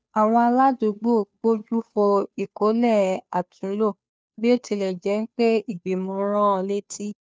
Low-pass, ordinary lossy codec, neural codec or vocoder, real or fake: none; none; codec, 16 kHz, 2 kbps, FunCodec, trained on Chinese and English, 25 frames a second; fake